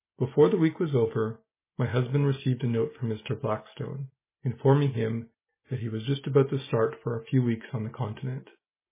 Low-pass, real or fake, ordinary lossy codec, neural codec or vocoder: 3.6 kHz; real; MP3, 16 kbps; none